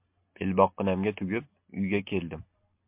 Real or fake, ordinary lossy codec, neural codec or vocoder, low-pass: real; MP3, 32 kbps; none; 3.6 kHz